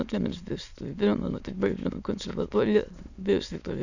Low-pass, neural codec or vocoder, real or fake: 7.2 kHz; autoencoder, 22.05 kHz, a latent of 192 numbers a frame, VITS, trained on many speakers; fake